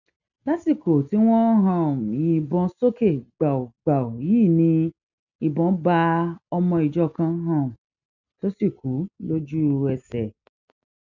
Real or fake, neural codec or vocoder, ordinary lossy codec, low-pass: real; none; none; 7.2 kHz